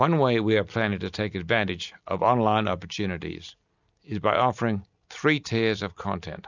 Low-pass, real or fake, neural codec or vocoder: 7.2 kHz; real; none